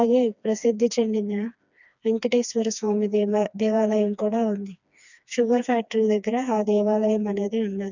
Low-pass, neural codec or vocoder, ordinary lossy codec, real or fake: 7.2 kHz; codec, 16 kHz, 2 kbps, FreqCodec, smaller model; none; fake